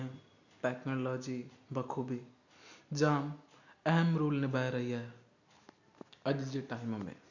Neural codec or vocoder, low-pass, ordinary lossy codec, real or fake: none; 7.2 kHz; none; real